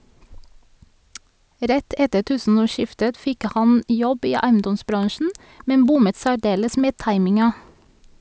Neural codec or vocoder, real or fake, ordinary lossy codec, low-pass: none; real; none; none